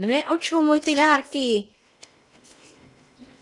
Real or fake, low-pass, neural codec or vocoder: fake; 10.8 kHz; codec, 16 kHz in and 24 kHz out, 0.8 kbps, FocalCodec, streaming, 65536 codes